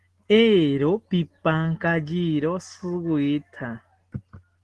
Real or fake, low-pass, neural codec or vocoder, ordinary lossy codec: real; 10.8 kHz; none; Opus, 16 kbps